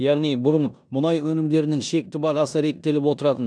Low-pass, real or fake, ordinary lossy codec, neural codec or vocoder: 9.9 kHz; fake; none; codec, 16 kHz in and 24 kHz out, 0.9 kbps, LongCat-Audio-Codec, four codebook decoder